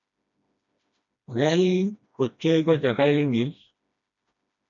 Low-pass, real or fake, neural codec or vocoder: 7.2 kHz; fake; codec, 16 kHz, 1 kbps, FreqCodec, smaller model